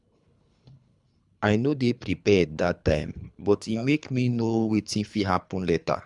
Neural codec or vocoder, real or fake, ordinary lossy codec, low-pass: codec, 24 kHz, 3 kbps, HILCodec; fake; none; 10.8 kHz